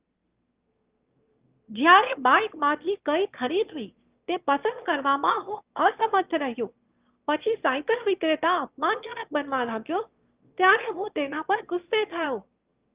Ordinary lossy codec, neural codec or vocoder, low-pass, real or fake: Opus, 24 kbps; autoencoder, 22.05 kHz, a latent of 192 numbers a frame, VITS, trained on one speaker; 3.6 kHz; fake